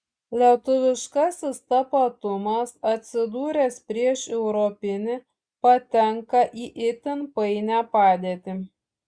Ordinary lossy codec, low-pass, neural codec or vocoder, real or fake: Opus, 64 kbps; 9.9 kHz; none; real